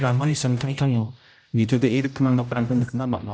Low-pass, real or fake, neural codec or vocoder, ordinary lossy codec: none; fake; codec, 16 kHz, 0.5 kbps, X-Codec, HuBERT features, trained on general audio; none